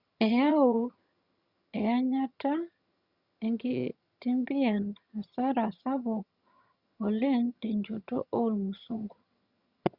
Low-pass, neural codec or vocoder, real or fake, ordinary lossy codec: 5.4 kHz; vocoder, 22.05 kHz, 80 mel bands, HiFi-GAN; fake; Opus, 64 kbps